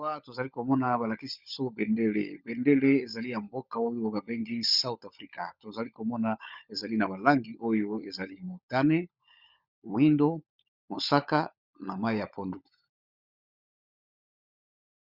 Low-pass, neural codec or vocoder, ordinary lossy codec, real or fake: 5.4 kHz; codec, 16 kHz, 16 kbps, FunCodec, trained on LibriTTS, 50 frames a second; Opus, 64 kbps; fake